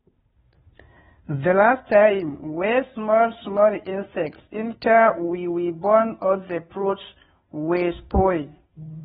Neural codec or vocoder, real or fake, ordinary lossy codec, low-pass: codec, 16 kHz, 2 kbps, FunCodec, trained on Chinese and English, 25 frames a second; fake; AAC, 16 kbps; 7.2 kHz